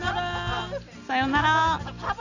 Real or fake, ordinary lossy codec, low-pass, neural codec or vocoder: real; none; 7.2 kHz; none